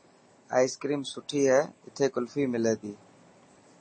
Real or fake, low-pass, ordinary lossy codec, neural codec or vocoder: real; 9.9 kHz; MP3, 32 kbps; none